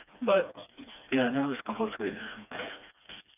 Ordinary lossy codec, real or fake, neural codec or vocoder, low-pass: none; fake; codec, 16 kHz, 2 kbps, FreqCodec, smaller model; 3.6 kHz